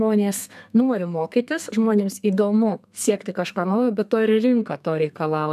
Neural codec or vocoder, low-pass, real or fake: codec, 32 kHz, 1.9 kbps, SNAC; 14.4 kHz; fake